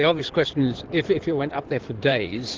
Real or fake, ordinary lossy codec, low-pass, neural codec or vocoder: fake; Opus, 16 kbps; 7.2 kHz; codec, 16 kHz in and 24 kHz out, 2.2 kbps, FireRedTTS-2 codec